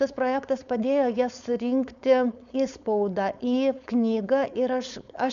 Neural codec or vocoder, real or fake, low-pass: codec, 16 kHz, 4.8 kbps, FACodec; fake; 7.2 kHz